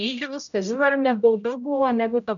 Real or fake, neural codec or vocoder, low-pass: fake; codec, 16 kHz, 0.5 kbps, X-Codec, HuBERT features, trained on general audio; 7.2 kHz